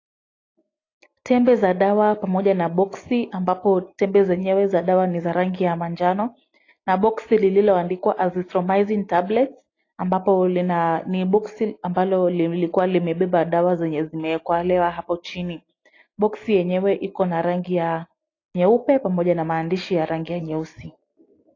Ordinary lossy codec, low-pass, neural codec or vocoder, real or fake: AAC, 32 kbps; 7.2 kHz; none; real